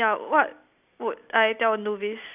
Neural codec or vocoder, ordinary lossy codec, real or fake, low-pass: none; none; real; 3.6 kHz